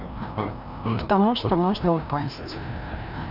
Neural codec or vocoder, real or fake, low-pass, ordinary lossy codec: codec, 16 kHz, 1 kbps, FreqCodec, larger model; fake; 5.4 kHz; none